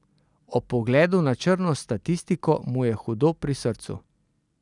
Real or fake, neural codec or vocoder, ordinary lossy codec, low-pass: real; none; none; 10.8 kHz